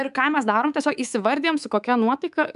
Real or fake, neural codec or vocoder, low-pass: fake; codec, 24 kHz, 3.1 kbps, DualCodec; 10.8 kHz